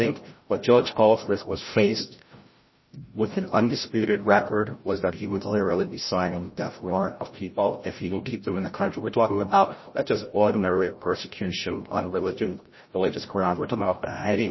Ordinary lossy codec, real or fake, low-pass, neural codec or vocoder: MP3, 24 kbps; fake; 7.2 kHz; codec, 16 kHz, 0.5 kbps, FreqCodec, larger model